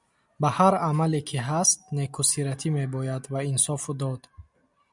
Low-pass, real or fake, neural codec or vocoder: 10.8 kHz; real; none